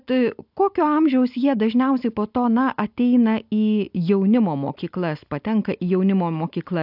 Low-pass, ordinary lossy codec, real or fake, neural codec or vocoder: 5.4 kHz; AAC, 48 kbps; real; none